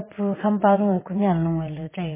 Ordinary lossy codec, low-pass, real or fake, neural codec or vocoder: MP3, 16 kbps; 3.6 kHz; fake; vocoder, 22.05 kHz, 80 mel bands, WaveNeXt